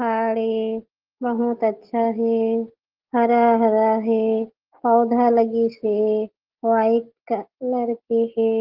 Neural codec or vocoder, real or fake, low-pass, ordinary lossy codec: none; real; 5.4 kHz; Opus, 16 kbps